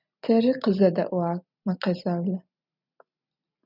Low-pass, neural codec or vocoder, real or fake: 5.4 kHz; none; real